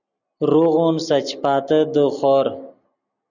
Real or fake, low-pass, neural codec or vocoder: real; 7.2 kHz; none